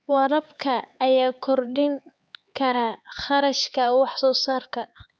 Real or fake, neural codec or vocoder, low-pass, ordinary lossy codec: fake; codec, 16 kHz, 4 kbps, X-Codec, HuBERT features, trained on LibriSpeech; none; none